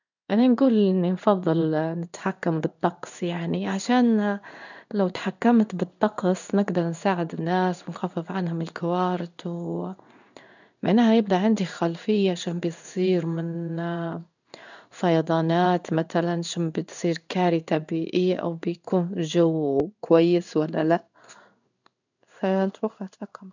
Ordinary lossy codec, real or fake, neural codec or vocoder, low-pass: none; fake; codec, 16 kHz in and 24 kHz out, 1 kbps, XY-Tokenizer; 7.2 kHz